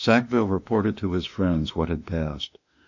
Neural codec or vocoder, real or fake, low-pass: autoencoder, 48 kHz, 32 numbers a frame, DAC-VAE, trained on Japanese speech; fake; 7.2 kHz